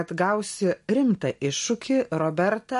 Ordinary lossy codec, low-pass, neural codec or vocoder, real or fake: MP3, 48 kbps; 14.4 kHz; none; real